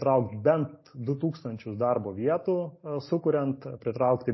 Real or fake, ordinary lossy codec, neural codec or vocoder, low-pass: real; MP3, 24 kbps; none; 7.2 kHz